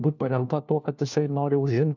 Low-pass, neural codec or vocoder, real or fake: 7.2 kHz; codec, 16 kHz, 1 kbps, FunCodec, trained on LibriTTS, 50 frames a second; fake